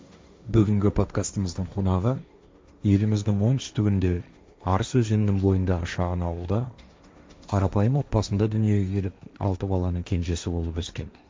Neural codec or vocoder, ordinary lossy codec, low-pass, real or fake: codec, 16 kHz, 1.1 kbps, Voila-Tokenizer; none; none; fake